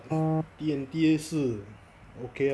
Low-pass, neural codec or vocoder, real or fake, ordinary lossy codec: none; none; real; none